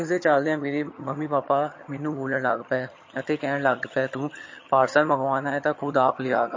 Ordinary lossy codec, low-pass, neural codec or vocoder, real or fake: MP3, 32 kbps; 7.2 kHz; vocoder, 22.05 kHz, 80 mel bands, HiFi-GAN; fake